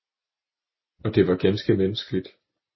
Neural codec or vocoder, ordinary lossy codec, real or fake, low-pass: none; MP3, 24 kbps; real; 7.2 kHz